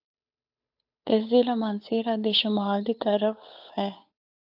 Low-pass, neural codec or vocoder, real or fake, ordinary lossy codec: 5.4 kHz; codec, 16 kHz, 8 kbps, FunCodec, trained on Chinese and English, 25 frames a second; fake; AAC, 48 kbps